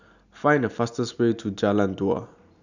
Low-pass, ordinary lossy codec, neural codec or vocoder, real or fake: 7.2 kHz; none; none; real